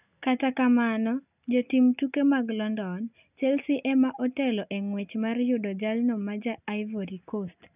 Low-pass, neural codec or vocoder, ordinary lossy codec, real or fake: 3.6 kHz; none; none; real